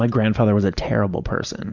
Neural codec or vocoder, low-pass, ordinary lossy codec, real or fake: none; 7.2 kHz; Opus, 64 kbps; real